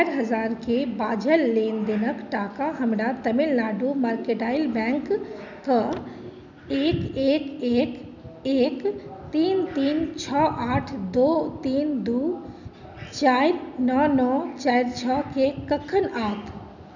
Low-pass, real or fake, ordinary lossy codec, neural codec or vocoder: 7.2 kHz; real; none; none